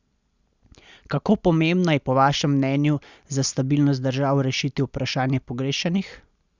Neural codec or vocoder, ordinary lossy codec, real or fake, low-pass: none; Opus, 64 kbps; real; 7.2 kHz